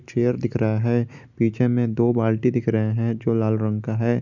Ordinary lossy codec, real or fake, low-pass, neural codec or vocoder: none; real; 7.2 kHz; none